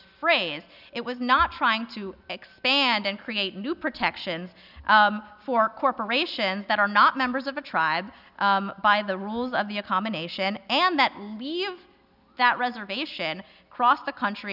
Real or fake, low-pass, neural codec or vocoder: real; 5.4 kHz; none